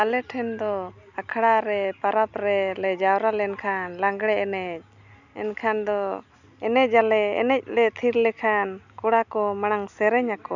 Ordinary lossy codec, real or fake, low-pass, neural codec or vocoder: none; real; 7.2 kHz; none